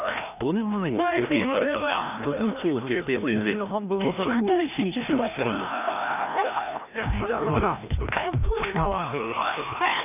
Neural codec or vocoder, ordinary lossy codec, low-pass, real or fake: codec, 16 kHz, 1 kbps, FreqCodec, larger model; none; 3.6 kHz; fake